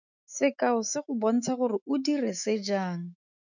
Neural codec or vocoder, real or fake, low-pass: autoencoder, 48 kHz, 128 numbers a frame, DAC-VAE, trained on Japanese speech; fake; 7.2 kHz